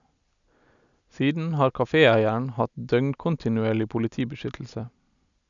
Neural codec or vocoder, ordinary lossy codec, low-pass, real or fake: none; none; 7.2 kHz; real